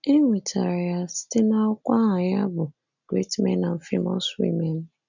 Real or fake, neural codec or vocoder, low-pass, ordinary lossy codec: real; none; 7.2 kHz; none